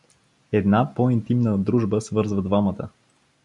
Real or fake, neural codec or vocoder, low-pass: real; none; 10.8 kHz